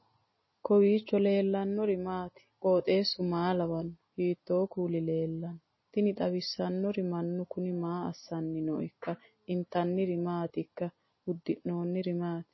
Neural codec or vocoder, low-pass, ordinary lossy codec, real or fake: none; 7.2 kHz; MP3, 24 kbps; real